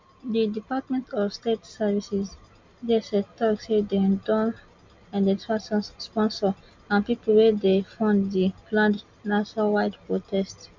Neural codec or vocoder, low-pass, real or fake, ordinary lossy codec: none; 7.2 kHz; real; MP3, 64 kbps